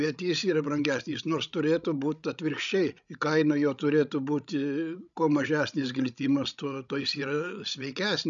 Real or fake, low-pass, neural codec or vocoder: fake; 7.2 kHz; codec, 16 kHz, 16 kbps, FreqCodec, larger model